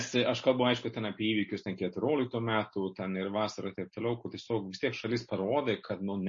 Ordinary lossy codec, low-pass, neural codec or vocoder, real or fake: MP3, 32 kbps; 7.2 kHz; none; real